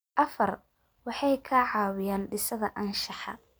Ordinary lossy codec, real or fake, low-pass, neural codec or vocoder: none; real; none; none